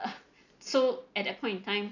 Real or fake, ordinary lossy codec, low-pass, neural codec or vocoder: real; none; 7.2 kHz; none